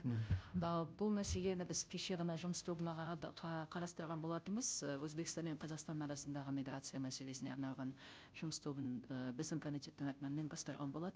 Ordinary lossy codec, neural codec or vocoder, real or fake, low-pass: none; codec, 16 kHz, 0.5 kbps, FunCodec, trained on Chinese and English, 25 frames a second; fake; none